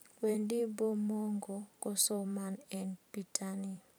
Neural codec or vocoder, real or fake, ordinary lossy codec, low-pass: vocoder, 44.1 kHz, 128 mel bands every 512 samples, BigVGAN v2; fake; none; none